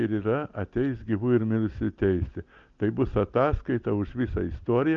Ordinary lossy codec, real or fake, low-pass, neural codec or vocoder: Opus, 24 kbps; real; 7.2 kHz; none